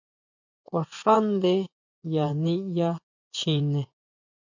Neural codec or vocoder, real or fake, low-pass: vocoder, 24 kHz, 100 mel bands, Vocos; fake; 7.2 kHz